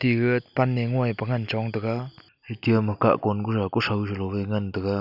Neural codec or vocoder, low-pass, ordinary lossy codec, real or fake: none; 5.4 kHz; none; real